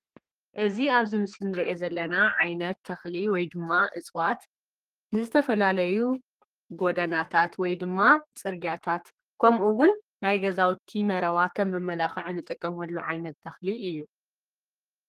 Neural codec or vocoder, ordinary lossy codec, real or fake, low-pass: codec, 32 kHz, 1.9 kbps, SNAC; Opus, 24 kbps; fake; 14.4 kHz